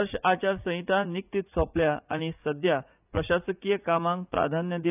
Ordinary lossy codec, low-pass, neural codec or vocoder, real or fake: none; 3.6 kHz; vocoder, 44.1 kHz, 80 mel bands, Vocos; fake